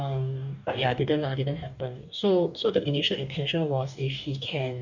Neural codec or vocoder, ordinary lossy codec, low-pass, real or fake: codec, 44.1 kHz, 2.6 kbps, DAC; none; 7.2 kHz; fake